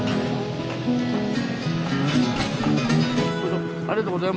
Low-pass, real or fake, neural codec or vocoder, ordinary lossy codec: none; real; none; none